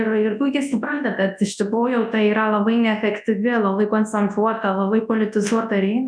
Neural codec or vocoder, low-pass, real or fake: codec, 24 kHz, 0.9 kbps, WavTokenizer, large speech release; 9.9 kHz; fake